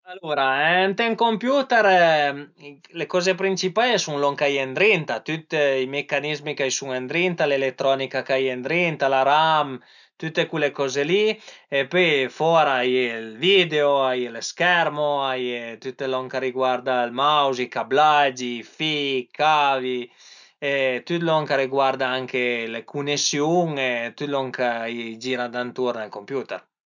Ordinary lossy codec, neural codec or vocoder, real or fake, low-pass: none; none; real; 7.2 kHz